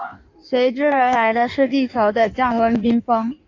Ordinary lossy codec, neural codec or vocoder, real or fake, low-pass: AAC, 48 kbps; codec, 16 kHz in and 24 kHz out, 1.1 kbps, FireRedTTS-2 codec; fake; 7.2 kHz